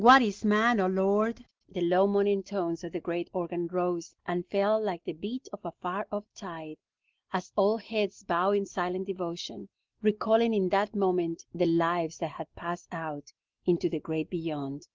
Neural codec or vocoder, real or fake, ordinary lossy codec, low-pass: none; real; Opus, 16 kbps; 7.2 kHz